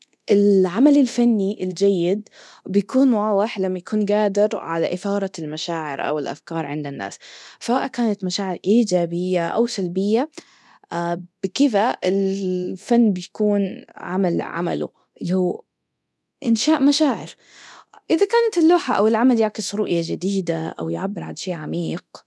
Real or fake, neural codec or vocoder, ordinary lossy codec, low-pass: fake; codec, 24 kHz, 0.9 kbps, DualCodec; none; none